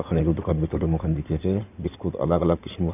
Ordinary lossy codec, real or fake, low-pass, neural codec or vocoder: none; fake; 3.6 kHz; codec, 16 kHz in and 24 kHz out, 2.2 kbps, FireRedTTS-2 codec